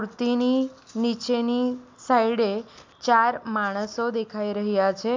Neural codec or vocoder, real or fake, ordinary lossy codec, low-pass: none; real; MP3, 64 kbps; 7.2 kHz